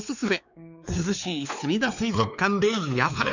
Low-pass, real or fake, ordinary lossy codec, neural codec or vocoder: 7.2 kHz; fake; none; codec, 16 kHz, 2 kbps, X-Codec, WavLM features, trained on Multilingual LibriSpeech